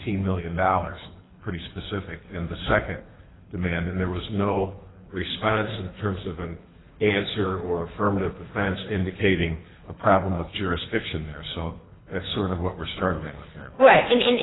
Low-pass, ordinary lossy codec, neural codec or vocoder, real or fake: 7.2 kHz; AAC, 16 kbps; codec, 24 kHz, 3 kbps, HILCodec; fake